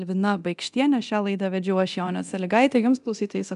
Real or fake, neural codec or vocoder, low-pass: fake; codec, 24 kHz, 0.9 kbps, DualCodec; 10.8 kHz